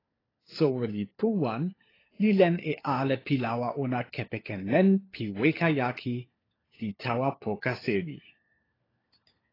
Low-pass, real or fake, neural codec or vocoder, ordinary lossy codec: 5.4 kHz; fake; codec, 16 kHz, 4 kbps, FunCodec, trained on LibriTTS, 50 frames a second; AAC, 24 kbps